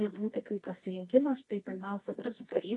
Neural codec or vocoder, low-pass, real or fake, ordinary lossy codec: codec, 24 kHz, 0.9 kbps, WavTokenizer, medium music audio release; 10.8 kHz; fake; AAC, 32 kbps